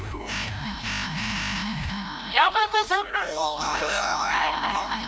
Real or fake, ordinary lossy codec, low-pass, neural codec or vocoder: fake; none; none; codec, 16 kHz, 0.5 kbps, FreqCodec, larger model